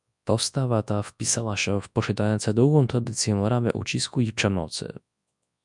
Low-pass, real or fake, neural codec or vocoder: 10.8 kHz; fake; codec, 24 kHz, 0.9 kbps, WavTokenizer, large speech release